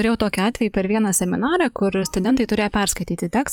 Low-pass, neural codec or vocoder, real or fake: 19.8 kHz; vocoder, 44.1 kHz, 128 mel bands, Pupu-Vocoder; fake